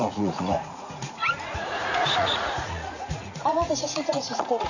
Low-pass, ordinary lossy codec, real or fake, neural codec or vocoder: 7.2 kHz; none; fake; vocoder, 44.1 kHz, 80 mel bands, Vocos